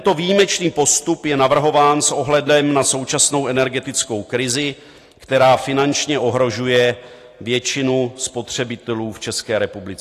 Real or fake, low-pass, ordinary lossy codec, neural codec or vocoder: real; 14.4 kHz; AAC, 48 kbps; none